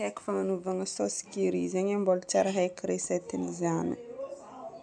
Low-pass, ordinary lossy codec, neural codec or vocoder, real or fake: 9.9 kHz; none; none; real